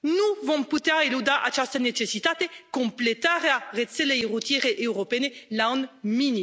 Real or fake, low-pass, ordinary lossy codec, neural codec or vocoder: real; none; none; none